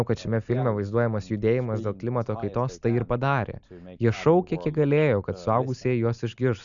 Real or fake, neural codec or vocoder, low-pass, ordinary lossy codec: real; none; 7.2 kHz; MP3, 96 kbps